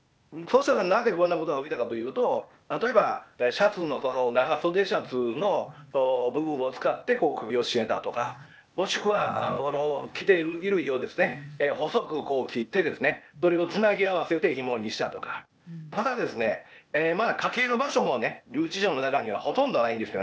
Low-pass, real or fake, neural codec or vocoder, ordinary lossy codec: none; fake; codec, 16 kHz, 0.8 kbps, ZipCodec; none